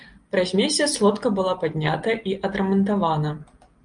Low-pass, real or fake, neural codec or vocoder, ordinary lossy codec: 9.9 kHz; real; none; Opus, 32 kbps